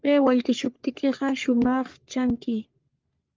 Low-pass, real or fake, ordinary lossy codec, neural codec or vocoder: 7.2 kHz; fake; Opus, 24 kbps; codec, 44.1 kHz, 3.4 kbps, Pupu-Codec